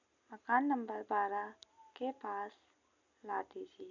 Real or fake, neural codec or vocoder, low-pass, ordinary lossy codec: real; none; 7.2 kHz; none